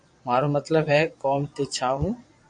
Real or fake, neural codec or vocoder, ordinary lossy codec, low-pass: fake; vocoder, 22.05 kHz, 80 mel bands, Vocos; MP3, 48 kbps; 9.9 kHz